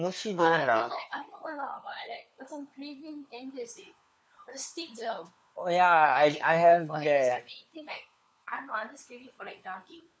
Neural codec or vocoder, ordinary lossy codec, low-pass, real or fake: codec, 16 kHz, 4 kbps, FunCodec, trained on LibriTTS, 50 frames a second; none; none; fake